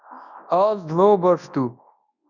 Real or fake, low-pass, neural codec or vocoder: fake; 7.2 kHz; codec, 24 kHz, 0.9 kbps, WavTokenizer, large speech release